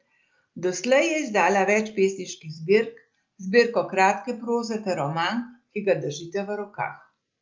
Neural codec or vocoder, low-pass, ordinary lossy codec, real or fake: none; 7.2 kHz; Opus, 24 kbps; real